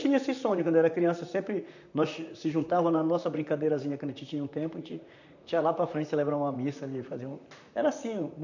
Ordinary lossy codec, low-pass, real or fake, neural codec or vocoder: none; 7.2 kHz; fake; vocoder, 44.1 kHz, 128 mel bands, Pupu-Vocoder